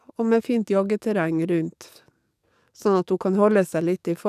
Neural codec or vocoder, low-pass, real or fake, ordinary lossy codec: codec, 44.1 kHz, 7.8 kbps, DAC; 14.4 kHz; fake; none